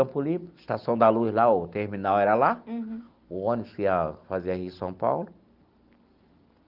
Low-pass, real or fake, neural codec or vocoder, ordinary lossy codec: 5.4 kHz; real; none; Opus, 32 kbps